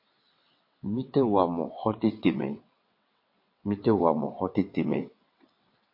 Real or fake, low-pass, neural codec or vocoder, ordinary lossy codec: fake; 5.4 kHz; vocoder, 22.05 kHz, 80 mel bands, WaveNeXt; MP3, 32 kbps